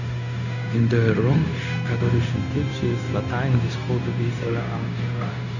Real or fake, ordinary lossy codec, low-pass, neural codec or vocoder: fake; none; 7.2 kHz; codec, 16 kHz, 0.4 kbps, LongCat-Audio-Codec